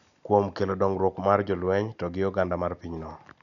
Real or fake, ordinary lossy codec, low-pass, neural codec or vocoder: real; none; 7.2 kHz; none